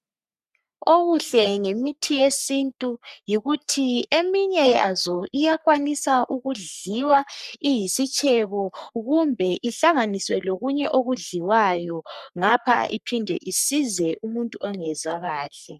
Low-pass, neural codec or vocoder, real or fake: 14.4 kHz; codec, 44.1 kHz, 3.4 kbps, Pupu-Codec; fake